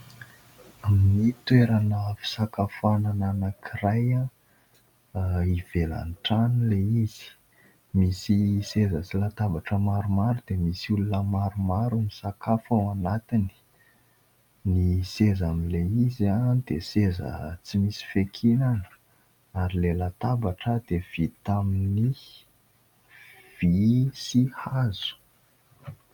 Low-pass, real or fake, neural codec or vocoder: 19.8 kHz; real; none